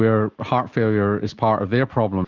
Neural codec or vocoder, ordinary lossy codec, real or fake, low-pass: none; Opus, 32 kbps; real; 7.2 kHz